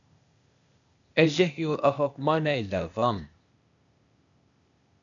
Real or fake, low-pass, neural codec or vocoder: fake; 7.2 kHz; codec, 16 kHz, 0.8 kbps, ZipCodec